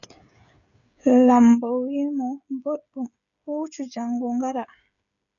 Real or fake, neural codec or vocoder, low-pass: fake; codec, 16 kHz, 16 kbps, FreqCodec, smaller model; 7.2 kHz